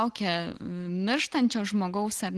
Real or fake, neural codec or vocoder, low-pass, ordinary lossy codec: real; none; 10.8 kHz; Opus, 16 kbps